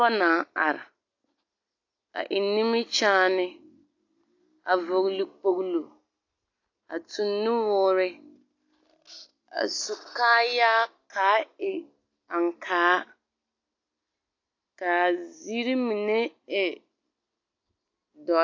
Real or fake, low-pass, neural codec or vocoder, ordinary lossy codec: real; 7.2 kHz; none; AAC, 48 kbps